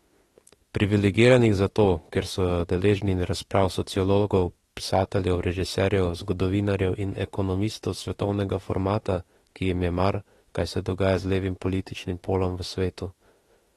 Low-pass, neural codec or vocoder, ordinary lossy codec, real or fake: 19.8 kHz; autoencoder, 48 kHz, 32 numbers a frame, DAC-VAE, trained on Japanese speech; AAC, 32 kbps; fake